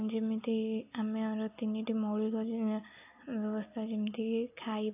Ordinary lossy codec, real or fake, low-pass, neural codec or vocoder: AAC, 24 kbps; real; 3.6 kHz; none